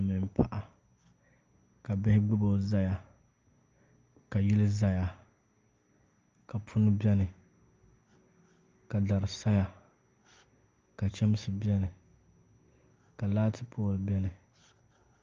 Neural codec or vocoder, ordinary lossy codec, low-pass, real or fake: none; Opus, 24 kbps; 7.2 kHz; real